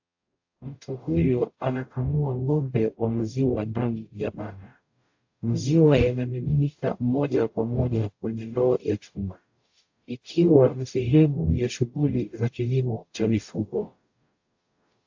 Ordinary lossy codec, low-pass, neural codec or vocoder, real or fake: AAC, 48 kbps; 7.2 kHz; codec, 44.1 kHz, 0.9 kbps, DAC; fake